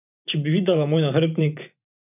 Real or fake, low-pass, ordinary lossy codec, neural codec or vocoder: fake; 3.6 kHz; none; vocoder, 24 kHz, 100 mel bands, Vocos